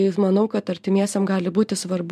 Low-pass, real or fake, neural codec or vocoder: 14.4 kHz; real; none